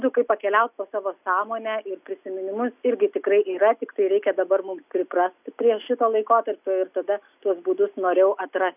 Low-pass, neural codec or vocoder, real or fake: 3.6 kHz; none; real